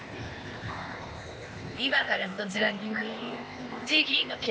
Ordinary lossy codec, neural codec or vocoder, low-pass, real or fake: none; codec, 16 kHz, 0.8 kbps, ZipCodec; none; fake